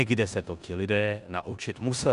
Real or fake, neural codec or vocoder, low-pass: fake; codec, 16 kHz in and 24 kHz out, 0.9 kbps, LongCat-Audio-Codec, four codebook decoder; 10.8 kHz